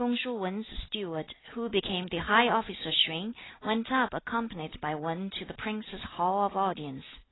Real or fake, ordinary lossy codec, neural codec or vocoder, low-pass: real; AAC, 16 kbps; none; 7.2 kHz